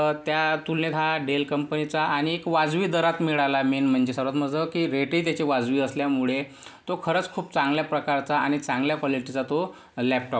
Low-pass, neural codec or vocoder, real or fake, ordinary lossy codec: none; none; real; none